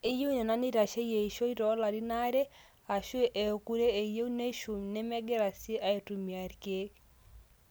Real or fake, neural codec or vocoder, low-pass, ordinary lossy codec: real; none; none; none